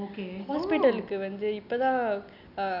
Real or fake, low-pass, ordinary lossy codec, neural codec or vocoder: real; 5.4 kHz; none; none